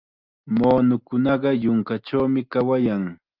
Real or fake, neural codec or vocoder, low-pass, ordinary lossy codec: real; none; 5.4 kHz; Opus, 24 kbps